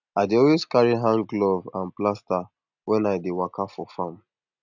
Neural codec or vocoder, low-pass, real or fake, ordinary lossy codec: none; 7.2 kHz; real; none